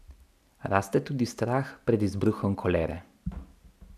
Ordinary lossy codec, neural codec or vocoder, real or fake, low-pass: none; none; real; 14.4 kHz